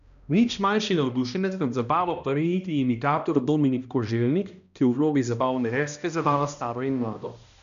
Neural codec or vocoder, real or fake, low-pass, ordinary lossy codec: codec, 16 kHz, 1 kbps, X-Codec, HuBERT features, trained on balanced general audio; fake; 7.2 kHz; none